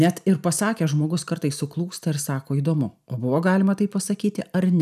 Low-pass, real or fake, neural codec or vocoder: 14.4 kHz; real; none